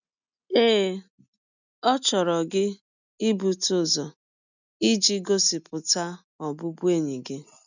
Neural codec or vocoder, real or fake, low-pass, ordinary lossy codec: none; real; 7.2 kHz; none